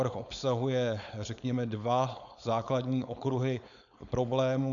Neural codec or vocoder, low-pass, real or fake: codec, 16 kHz, 4.8 kbps, FACodec; 7.2 kHz; fake